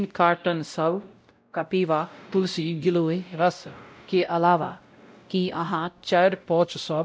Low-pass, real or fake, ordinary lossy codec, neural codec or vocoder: none; fake; none; codec, 16 kHz, 0.5 kbps, X-Codec, WavLM features, trained on Multilingual LibriSpeech